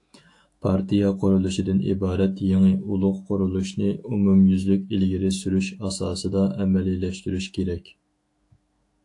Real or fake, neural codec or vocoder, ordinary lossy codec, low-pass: fake; autoencoder, 48 kHz, 128 numbers a frame, DAC-VAE, trained on Japanese speech; AAC, 48 kbps; 10.8 kHz